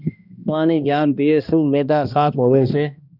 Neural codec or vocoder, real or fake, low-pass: codec, 16 kHz, 1 kbps, X-Codec, HuBERT features, trained on balanced general audio; fake; 5.4 kHz